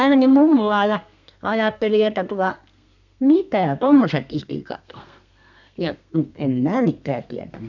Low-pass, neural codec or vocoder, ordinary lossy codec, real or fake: 7.2 kHz; codec, 32 kHz, 1.9 kbps, SNAC; none; fake